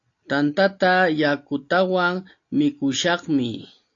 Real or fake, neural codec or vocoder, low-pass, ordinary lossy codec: real; none; 7.2 kHz; AAC, 48 kbps